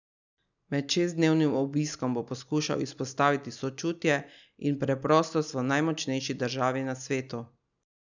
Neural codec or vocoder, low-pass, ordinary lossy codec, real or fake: none; 7.2 kHz; none; real